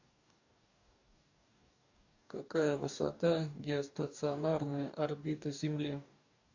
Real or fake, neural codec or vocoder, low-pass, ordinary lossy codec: fake; codec, 44.1 kHz, 2.6 kbps, DAC; 7.2 kHz; AAC, 48 kbps